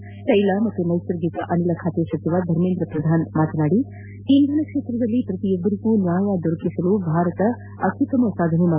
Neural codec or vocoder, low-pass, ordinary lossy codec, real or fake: none; 3.6 kHz; none; real